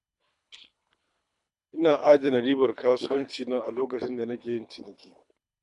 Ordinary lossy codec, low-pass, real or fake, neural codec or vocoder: none; 10.8 kHz; fake; codec, 24 kHz, 3 kbps, HILCodec